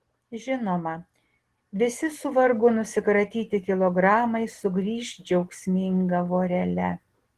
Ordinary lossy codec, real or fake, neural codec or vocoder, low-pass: Opus, 16 kbps; real; none; 14.4 kHz